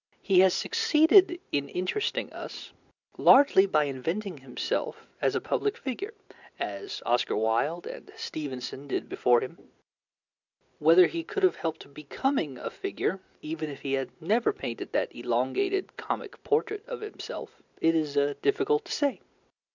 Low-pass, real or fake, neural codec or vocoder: 7.2 kHz; real; none